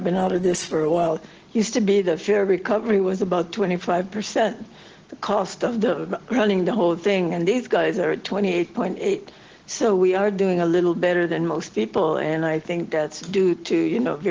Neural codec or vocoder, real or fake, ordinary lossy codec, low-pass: none; real; Opus, 16 kbps; 7.2 kHz